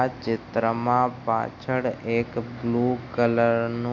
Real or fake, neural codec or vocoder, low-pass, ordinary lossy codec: real; none; 7.2 kHz; AAC, 48 kbps